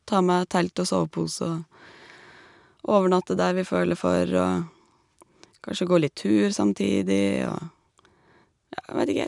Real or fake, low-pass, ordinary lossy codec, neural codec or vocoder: real; 10.8 kHz; none; none